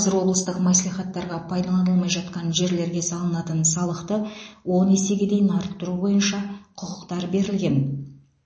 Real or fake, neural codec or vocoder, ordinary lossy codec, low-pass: real; none; MP3, 32 kbps; 9.9 kHz